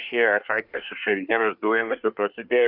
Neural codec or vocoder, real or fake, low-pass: codec, 24 kHz, 1 kbps, SNAC; fake; 5.4 kHz